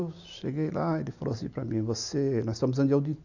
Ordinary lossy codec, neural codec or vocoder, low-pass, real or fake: none; none; 7.2 kHz; real